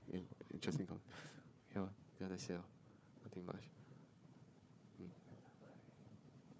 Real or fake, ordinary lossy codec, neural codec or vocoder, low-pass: fake; none; codec, 16 kHz, 4 kbps, FunCodec, trained on Chinese and English, 50 frames a second; none